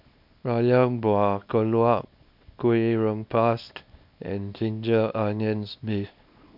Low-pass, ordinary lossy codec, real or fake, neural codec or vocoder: 5.4 kHz; none; fake; codec, 24 kHz, 0.9 kbps, WavTokenizer, small release